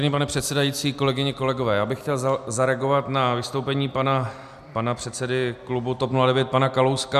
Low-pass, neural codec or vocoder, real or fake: 14.4 kHz; none; real